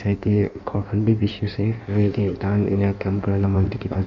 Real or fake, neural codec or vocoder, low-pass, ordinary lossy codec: fake; codec, 16 kHz in and 24 kHz out, 1.1 kbps, FireRedTTS-2 codec; 7.2 kHz; none